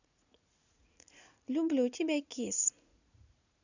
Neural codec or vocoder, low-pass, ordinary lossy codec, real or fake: vocoder, 44.1 kHz, 80 mel bands, Vocos; 7.2 kHz; none; fake